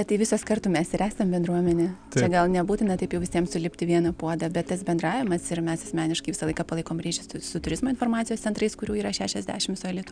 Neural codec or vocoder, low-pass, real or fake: none; 9.9 kHz; real